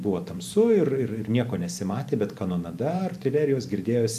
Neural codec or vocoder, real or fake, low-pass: vocoder, 44.1 kHz, 128 mel bands every 512 samples, BigVGAN v2; fake; 14.4 kHz